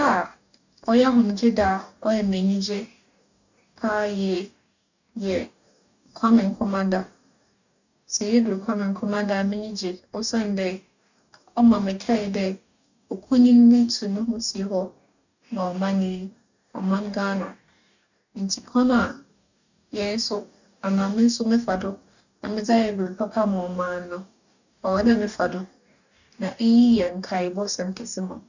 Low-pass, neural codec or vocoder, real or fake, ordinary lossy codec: 7.2 kHz; codec, 44.1 kHz, 2.6 kbps, DAC; fake; none